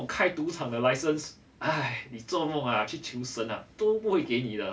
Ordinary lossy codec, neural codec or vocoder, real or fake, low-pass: none; none; real; none